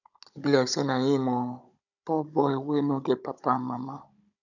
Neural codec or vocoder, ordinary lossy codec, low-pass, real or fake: codec, 16 kHz, 16 kbps, FunCodec, trained on Chinese and English, 50 frames a second; none; 7.2 kHz; fake